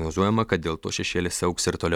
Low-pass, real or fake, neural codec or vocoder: 19.8 kHz; real; none